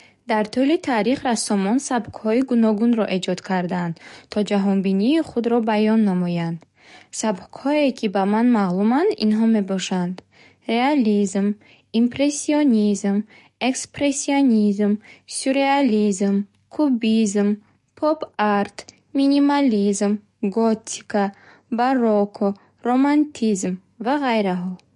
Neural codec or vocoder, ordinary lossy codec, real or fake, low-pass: codec, 44.1 kHz, 7.8 kbps, DAC; MP3, 48 kbps; fake; 14.4 kHz